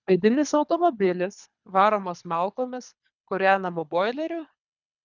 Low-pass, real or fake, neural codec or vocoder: 7.2 kHz; fake; codec, 24 kHz, 3 kbps, HILCodec